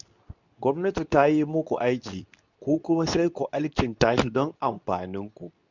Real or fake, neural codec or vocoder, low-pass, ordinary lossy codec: fake; codec, 24 kHz, 0.9 kbps, WavTokenizer, medium speech release version 2; 7.2 kHz; none